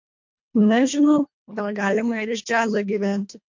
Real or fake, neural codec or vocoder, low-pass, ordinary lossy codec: fake; codec, 24 kHz, 1.5 kbps, HILCodec; 7.2 kHz; MP3, 48 kbps